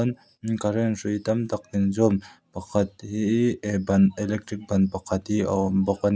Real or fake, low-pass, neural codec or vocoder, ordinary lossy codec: real; none; none; none